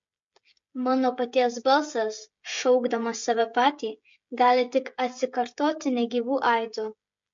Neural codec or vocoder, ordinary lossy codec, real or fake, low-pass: codec, 16 kHz, 16 kbps, FreqCodec, smaller model; MP3, 48 kbps; fake; 7.2 kHz